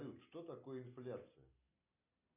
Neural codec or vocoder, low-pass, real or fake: none; 3.6 kHz; real